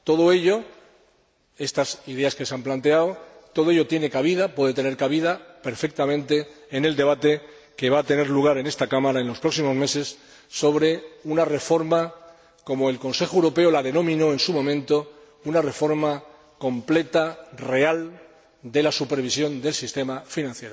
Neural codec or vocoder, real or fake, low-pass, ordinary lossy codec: none; real; none; none